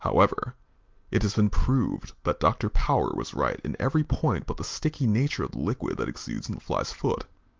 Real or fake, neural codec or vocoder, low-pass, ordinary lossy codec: real; none; 7.2 kHz; Opus, 32 kbps